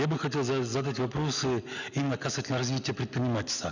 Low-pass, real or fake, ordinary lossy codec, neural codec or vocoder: 7.2 kHz; real; none; none